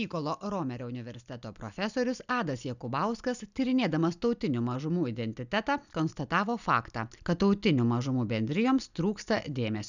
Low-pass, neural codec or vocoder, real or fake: 7.2 kHz; none; real